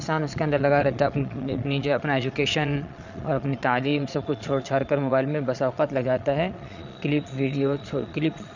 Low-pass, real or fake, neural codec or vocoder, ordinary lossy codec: 7.2 kHz; fake; vocoder, 22.05 kHz, 80 mel bands, Vocos; none